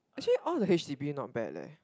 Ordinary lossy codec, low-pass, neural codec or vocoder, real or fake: none; none; none; real